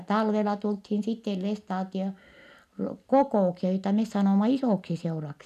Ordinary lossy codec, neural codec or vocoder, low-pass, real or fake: none; none; 14.4 kHz; real